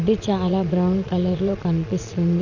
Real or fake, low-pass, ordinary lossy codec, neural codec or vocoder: fake; 7.2 kHz; none; vocoder, 22.05 kHz, 80 mel bands, Vocos